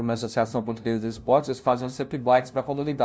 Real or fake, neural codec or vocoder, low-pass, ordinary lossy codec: fake; codec, 16 kHz, 0.5 kbps, FunCodec, trained on LibriTTS, 25 frames a second; none; none